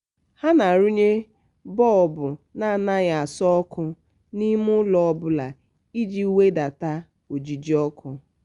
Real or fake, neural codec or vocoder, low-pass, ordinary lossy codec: real; none; 10.8 kHz; Opus, 64 kbps